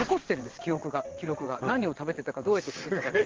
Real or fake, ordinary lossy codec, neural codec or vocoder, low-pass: fake; Opus, 16 kbps; vocoder, 22.05 kHz, 80 mel bands, WaveNeXt; 7.2 kHz